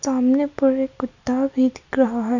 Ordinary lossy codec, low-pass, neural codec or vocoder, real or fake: none; 7.2 kHz; none; real